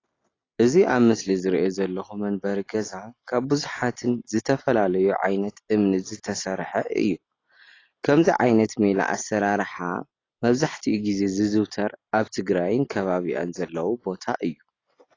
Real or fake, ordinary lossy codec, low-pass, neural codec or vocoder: real; AAC, 32 kbps; 7.2 kHz; none